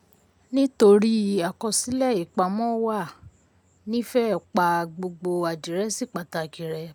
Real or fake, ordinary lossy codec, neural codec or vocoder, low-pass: real; none; none; none